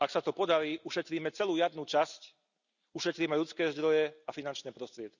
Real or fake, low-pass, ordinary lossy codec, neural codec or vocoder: real; 7.2 kHz; none; none